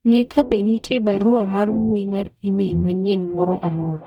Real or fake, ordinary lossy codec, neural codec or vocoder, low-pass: fake; none; codec, 44.1 kHz, 0.9 kbps, DAC; 19.8 kHz